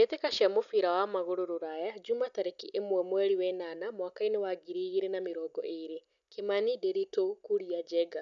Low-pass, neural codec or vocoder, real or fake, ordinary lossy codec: 7.2 kHz; none; real; none